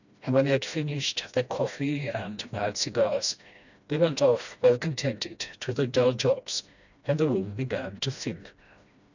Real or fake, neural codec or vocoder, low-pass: fake; codec, 16 kHz, 1 kbps, FreqCodec, smaller model; 7.2 kHz